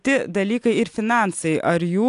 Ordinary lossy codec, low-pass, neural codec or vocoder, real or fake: AAC, 64 kbps; 10.8 kHz; none; real